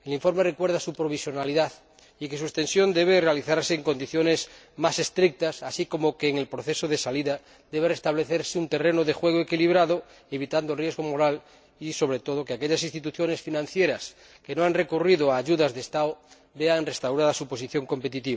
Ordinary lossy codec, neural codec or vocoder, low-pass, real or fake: none; none; none; real